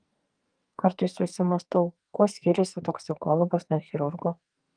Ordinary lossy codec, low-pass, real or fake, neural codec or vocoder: Opus, 32 kbps; 9.9 kHz; fake; codec, 44.1 kHz, 2.6 kbps, SNAC